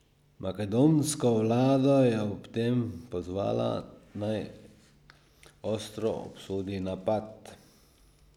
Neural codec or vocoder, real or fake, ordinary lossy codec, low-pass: none; real; none; 19.8 kHz